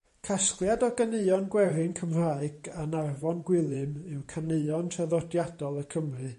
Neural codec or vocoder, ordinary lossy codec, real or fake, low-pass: vocoder, 44.1 kHz, 128 mel bands every 512 samples, BigVGAN v2; MP3, 48 kbps; fake; 14.4 kHz